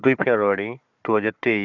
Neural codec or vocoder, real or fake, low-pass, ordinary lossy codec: codec, 16 kHz, 4 kbps, FunCodec, trained on Chinese and English, 50 frames a second; fake; 7.2 kHz; none